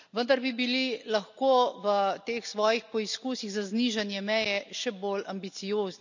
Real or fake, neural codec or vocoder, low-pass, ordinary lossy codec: real; none; 7.2 kHz; none